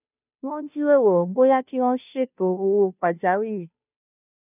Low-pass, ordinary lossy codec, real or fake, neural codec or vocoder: 3.6 kHz; none; fake; codec, 16 kHz, 0.5 kbps, FunCodec, trained on Chinese and English, 25 frames a second